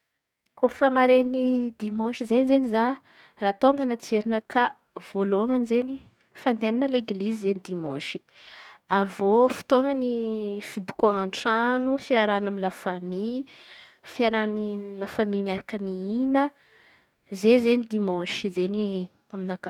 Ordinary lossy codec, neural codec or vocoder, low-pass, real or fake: none; codec, 44.1 kHz, 2.6 kbps, DAC; 19.8 kHz; fake